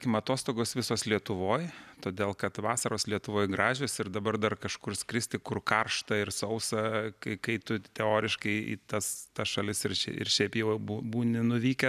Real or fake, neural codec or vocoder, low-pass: real; none; 14.4 kHz